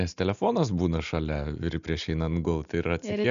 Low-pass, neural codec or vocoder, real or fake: 7.2 kHz; none; real